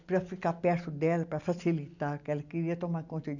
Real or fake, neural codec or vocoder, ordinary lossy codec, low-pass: real; none; none; 7.2 kHz